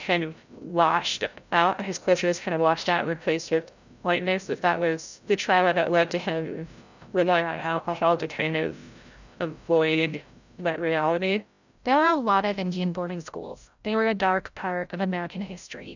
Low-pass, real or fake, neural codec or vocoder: 7.2 kHz; fake; codec, 16 kHz, 0.5 kbps, FreqCodec, larger model